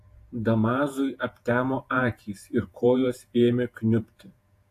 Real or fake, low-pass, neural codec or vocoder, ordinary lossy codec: fake; 14.4 kHz; vocoder, 48 kHz, 128 mel bands, Vocos; AAC, 64 kbps